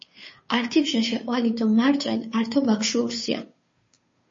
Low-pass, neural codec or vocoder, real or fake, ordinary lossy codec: 7.2 kHz; codec, 16 kHz, 2 kbps, FunCodec, trained on Chinese and English, 25 frames a second; fake; MP3, 32 kbps